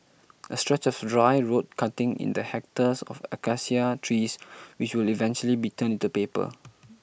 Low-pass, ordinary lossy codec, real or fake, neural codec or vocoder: none; none; real; none